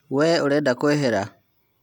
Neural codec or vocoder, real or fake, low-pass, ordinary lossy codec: none; real; 19.8 kHz; none